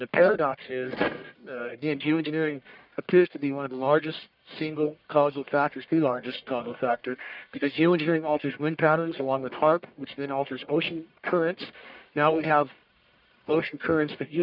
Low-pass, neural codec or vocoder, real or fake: 5.4 kHz; codec, 44.1 kHz, 1.7 kbps, Pupu-Codec; fake